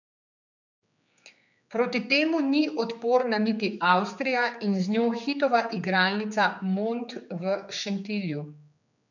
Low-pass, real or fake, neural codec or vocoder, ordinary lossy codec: 7.2 kHz; fake; codec, 16 kHz, 4 kbps, X-Codec, HuBERT features, trained on general audio; none